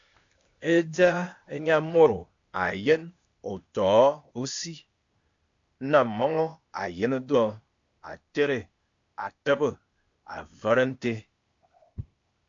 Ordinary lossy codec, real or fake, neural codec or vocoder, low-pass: AAC, 64 kbps; fake; codec, 16 kHz, 0.8 kbps, ZipCodec; 7.2 kHz